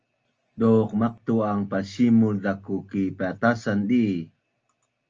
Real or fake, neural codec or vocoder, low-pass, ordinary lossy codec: real; none; 7.2 kHz; Opus, 24 kbps